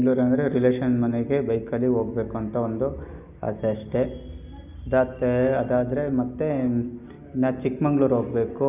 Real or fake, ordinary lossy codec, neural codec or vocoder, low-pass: real; none; none; 3.6 kHz